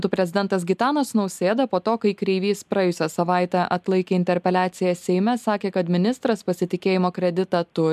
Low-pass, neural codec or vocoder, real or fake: 14.4 kHz; none; real